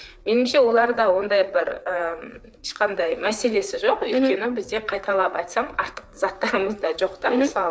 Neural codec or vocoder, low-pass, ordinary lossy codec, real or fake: codec, 16 kHz, 4 kbps, FreqCodec, larger model; none; none; fake